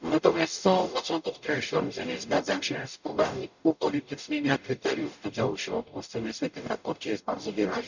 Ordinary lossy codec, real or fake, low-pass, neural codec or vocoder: none; fake; 7.2 kHz; codec, 44.1 kHz, 0.9 kbps, DAC